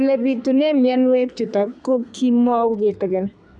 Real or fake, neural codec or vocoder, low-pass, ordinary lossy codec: fake; codec, 32 kHz, 1.9 kbps, SNAC; 10.8 kHz; none